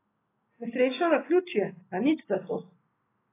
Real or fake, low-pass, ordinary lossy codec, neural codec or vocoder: real; 3.6 kHz; AAC, 24 kbps; none